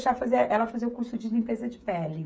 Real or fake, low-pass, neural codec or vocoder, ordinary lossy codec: fake; none; codec, 16 kHz, 16 kbps, FreqCodec, smaller model; none